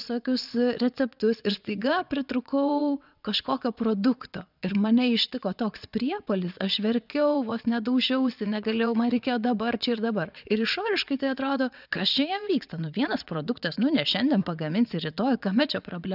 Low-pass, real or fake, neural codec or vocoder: 5.4 kHz; fake; vocoder, 22.05 kHz, 80 mel bands, WaveNeXt